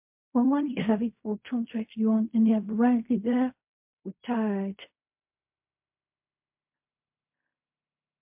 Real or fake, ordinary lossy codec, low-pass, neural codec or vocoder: fake; MP3, 32 kbps; 3.6 kHz; codec, 16 kHz in and 24 kHz out, 0.4 kbps, LongCat-Audio-Codec, fine tuned four codebook decoder